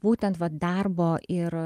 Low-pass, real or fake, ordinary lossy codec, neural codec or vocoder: 14.4 kHz; real; Opus, 32 kbps; none